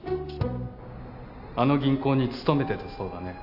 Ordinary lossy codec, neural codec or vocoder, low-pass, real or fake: none; none; 5.4 kHz; real